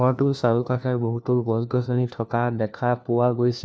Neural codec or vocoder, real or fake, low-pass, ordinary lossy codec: codec, 16 kHz, 1 kbps, FunCodec, trained on LibriTTS, 50 frames a second; fake; none; none